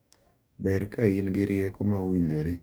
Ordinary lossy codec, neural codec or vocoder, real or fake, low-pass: none; codec, 44.1 kHz, 2.6 kbps, DAC; fake; none